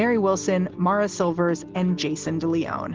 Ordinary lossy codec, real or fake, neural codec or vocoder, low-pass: Opus, 16 kbps; real; none; 7.2 kHz